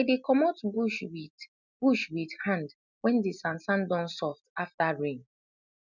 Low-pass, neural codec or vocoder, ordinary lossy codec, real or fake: 7.2 kHz; none; none; real